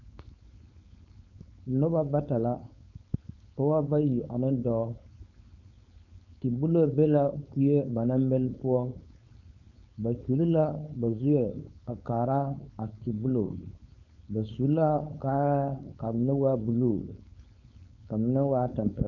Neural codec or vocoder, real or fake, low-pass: codec, 16 kHz, 4.8 kbps, FACodec; fake; 7.2 kHz